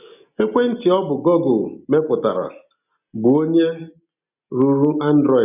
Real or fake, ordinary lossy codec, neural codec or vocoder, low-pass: real; none; none; 3.6 kHz